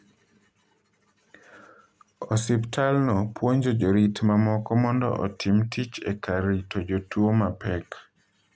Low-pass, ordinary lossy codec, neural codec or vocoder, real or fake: none; none; none; real